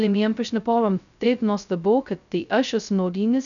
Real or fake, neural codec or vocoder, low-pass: fake; codec, 16 kHz, 0.2 kbps, FocalCodec; 7.2 kHz